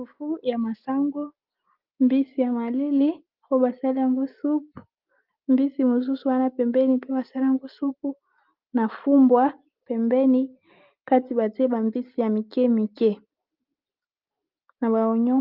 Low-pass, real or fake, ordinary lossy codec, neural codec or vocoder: 5.4 kHz; real; Opus, 32 kbps; none